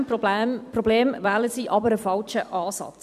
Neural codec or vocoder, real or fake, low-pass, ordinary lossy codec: vocoder, 44.1 kHz, 128 mel bands every 256 samples, BigVGAN v2; fake; 14.4 kHz; none